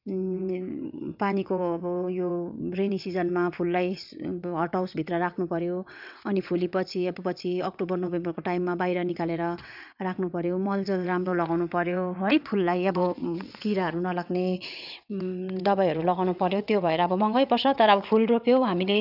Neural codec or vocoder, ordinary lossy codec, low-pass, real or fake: vocoder, 22.05 kHz, 80 mel bands, WaveNeXt; none; 5.4 kHz; fake